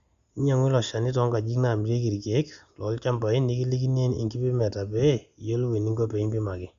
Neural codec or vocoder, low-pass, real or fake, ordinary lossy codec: none; 7.2 kHz; real; Opus, 64 kbps